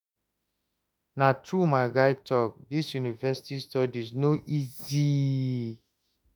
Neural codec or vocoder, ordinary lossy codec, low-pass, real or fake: autoencoder, 48 kHz, 32 numbers a frame, DAC-VAE, trained on Japanese speech; none; none; fake